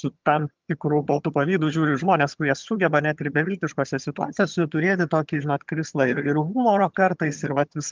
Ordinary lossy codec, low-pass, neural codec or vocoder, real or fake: Opus, 24 kbps; 7.2 kHz; vocoder, 22.05 kHz, 80 mel bands, HiFi-GAN; fake